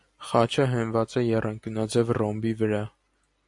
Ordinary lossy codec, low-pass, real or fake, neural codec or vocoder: AAC, 64 kbps; 10.8 kHz; real; none